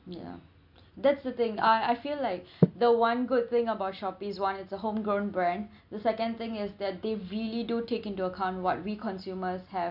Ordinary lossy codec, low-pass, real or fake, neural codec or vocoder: none; 5.4 kHz; real; none